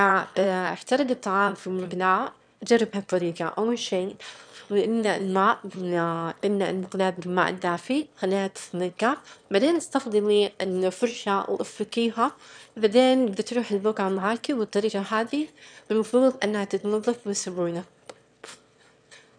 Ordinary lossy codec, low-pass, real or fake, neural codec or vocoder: none; 9.9 kHz; fake; autoencoder, 22.05 kHz, a latent of 192 numbers a frame, VITS, trained on one speaker